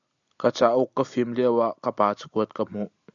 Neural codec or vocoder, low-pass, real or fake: none; 7.2 kHz; real